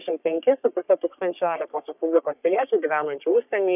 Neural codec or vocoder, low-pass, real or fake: codec, 44.1 kHz, 3.4 kbps, Pupu-Codec; 3.6 kHz; fake